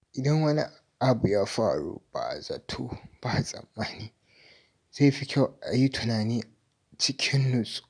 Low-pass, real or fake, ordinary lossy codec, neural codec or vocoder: 9.9 kHz; real; none; none